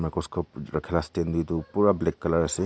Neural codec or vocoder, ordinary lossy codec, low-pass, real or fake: none; none; none; real